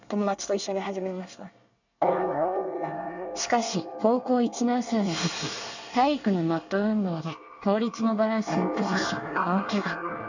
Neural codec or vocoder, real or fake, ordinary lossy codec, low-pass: codec, 24 kHz, 1 kbps, SNAC; fake; none; 7.2 kHz